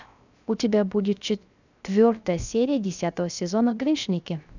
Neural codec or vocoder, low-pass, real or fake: codec, 16 kHz, 0.3 kbps, FocalCodec; 7.2 kHz; fake